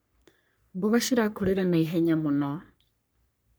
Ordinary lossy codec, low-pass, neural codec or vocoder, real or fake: none; none; codec, 44.1 kHz, 3.4 kbps, Pupu-Codec; fake